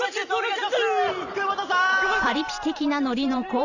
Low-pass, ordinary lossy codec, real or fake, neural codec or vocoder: 7.2 kHz; none; fake; vocoder, 44.1 kHz, 128 mel bands every 512 samples, BigVGAN v2